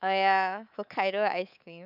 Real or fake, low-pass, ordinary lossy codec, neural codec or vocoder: fake; 5.4 kHz; none; codec, 24 kHz, 3.1 kbps, DualCodec